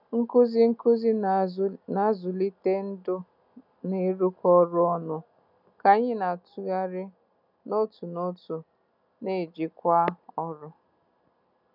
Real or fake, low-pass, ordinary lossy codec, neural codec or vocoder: real; 5.4 kHz; none; none